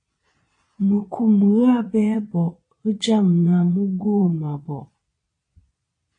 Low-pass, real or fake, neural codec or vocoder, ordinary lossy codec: 9.9 kHz; fake; vocoder, 22.05 kHz, 80 mel bands, Vocos; AAC, 48 kbps